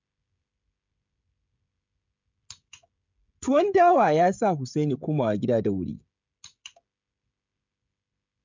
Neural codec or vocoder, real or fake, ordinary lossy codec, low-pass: codec, 16 kHz, 16 kbps, FreqCodec, smaller model; fake; MP3, 64 kbps; 7.2 kHz